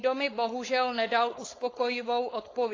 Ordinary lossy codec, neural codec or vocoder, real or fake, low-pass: AAC, 32 kbps; codec, 16 kHz, 4.8 kbps, FACodec; fake; 7.2 kHz